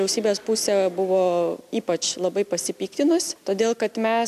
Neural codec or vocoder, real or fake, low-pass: none; real; 14.4 kHz